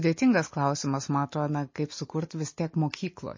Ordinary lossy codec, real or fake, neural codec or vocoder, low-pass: MP3, 32 kbps; real; none; 7.2 kHz